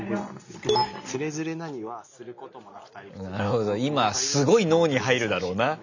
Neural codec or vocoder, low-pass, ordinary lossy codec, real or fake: none; 7.2 kHz; none; real